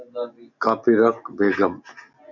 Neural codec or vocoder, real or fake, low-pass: none; real; 7.2 kHz